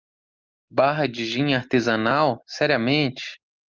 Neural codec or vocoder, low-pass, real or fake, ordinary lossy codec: none; 7.2 kHz; real; Opus, 24 kbps